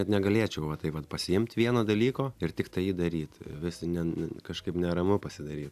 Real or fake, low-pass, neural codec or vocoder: real; 14.4 kHz; none